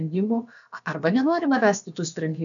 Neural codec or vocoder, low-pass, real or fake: codec, 16 kHz, about 1 kbps, DyCAST, with the encoder's durations; 7.2 kHz; fake